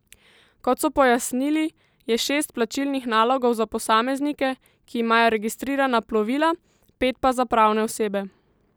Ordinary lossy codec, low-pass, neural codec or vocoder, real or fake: none; none; none; real